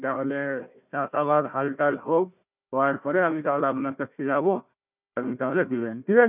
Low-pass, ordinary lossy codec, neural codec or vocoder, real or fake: 3.6 kHz; none; codec, 16 kHz, 1 kbps, FunCodec, trained on Chinese and English, 50 frames a second; fake